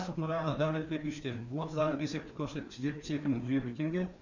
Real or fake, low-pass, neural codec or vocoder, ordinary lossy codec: fake; 7.2 kHz; codec, 16 kHz, 2 kbps, FreqCodec, larger model; none